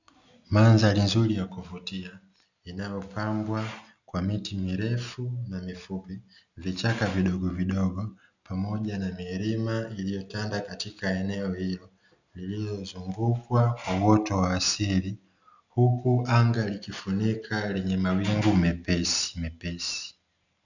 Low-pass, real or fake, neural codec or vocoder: 7.2 kHz; real; none